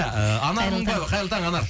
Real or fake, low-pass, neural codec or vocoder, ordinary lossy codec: real; none; none; none